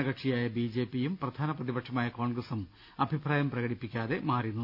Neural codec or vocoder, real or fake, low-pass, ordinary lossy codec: none; real; 5.4 kHz; none